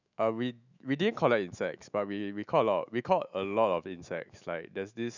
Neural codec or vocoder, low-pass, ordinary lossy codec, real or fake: autoencoder, 48 kHz, 128 numbers a frame, DAC-VAE, trained on Japanese speech; 7.2 kHz; none; fake